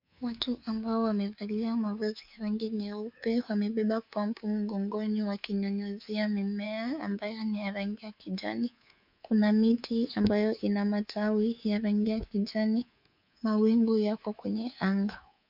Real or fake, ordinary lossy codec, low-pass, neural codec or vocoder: fake; MP3, 48 kbps; 5.4 kHz; codec, 24 kHz, 3.1 kbps, DualCodec